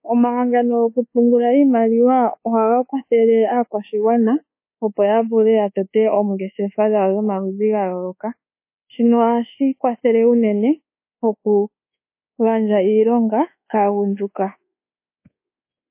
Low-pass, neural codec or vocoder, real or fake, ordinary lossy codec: 3.6 kHz; codec, 24 kHz, 1.2 kbps, DualCodec; fake; MP3, 24 kbps